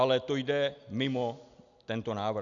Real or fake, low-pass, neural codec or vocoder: real; 7.2 kHz; none